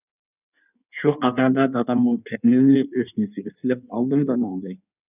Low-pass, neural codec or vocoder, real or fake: 3.6 kHz; codec, 16 kHz in and 24 kHz out, 1.1 kbps, FireRedTTS-2 codec; fake